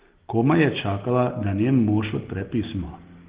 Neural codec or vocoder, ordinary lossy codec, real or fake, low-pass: none; Opus, 16 kbps; real; 3.6 kHz